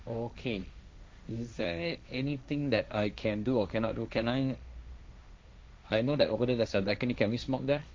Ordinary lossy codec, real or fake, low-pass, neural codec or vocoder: none; fake; none; codec, 16 kHz, 1.1 kbps, Voila-Tokenizer